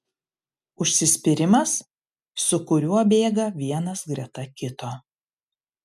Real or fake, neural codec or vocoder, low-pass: real; none; 14.4 kHz